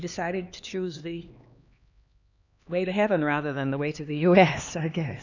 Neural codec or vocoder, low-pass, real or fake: codec, 16 kHz, 2 kbps, X-Codec, HuBERT features, trained on LibriSpeech; 7.2 kHz; fake